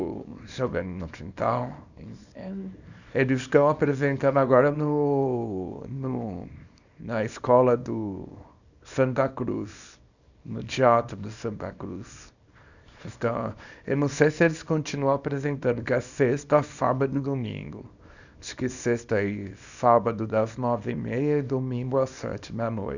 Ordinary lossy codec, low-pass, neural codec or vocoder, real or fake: none; 7.2 kHz; codec, 24 kHz, 0.9 kbps, WavTokenizer, small release; fake